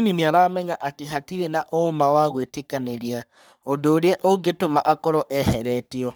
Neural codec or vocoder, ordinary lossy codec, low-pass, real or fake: codec, 44.1 kHz, 3.4 kbps, Pupu-Codec; none; none; fake